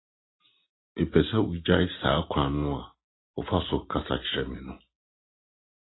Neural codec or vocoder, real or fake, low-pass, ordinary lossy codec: none; real; 7.2 kHz; AAC, 16 kbps